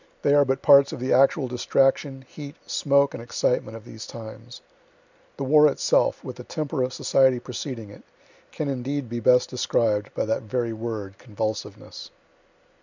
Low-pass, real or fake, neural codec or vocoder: 7.2 kHz; real; none